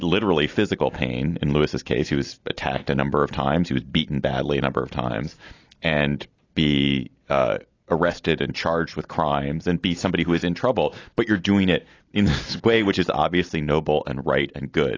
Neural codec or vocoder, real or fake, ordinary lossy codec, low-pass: none; real; AAC, 32 kbps; 7.2 kHz